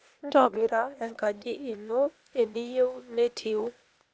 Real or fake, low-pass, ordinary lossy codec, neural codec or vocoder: fake; none; none; codec, 16 kHz, 0.8 kbps, ZipCodec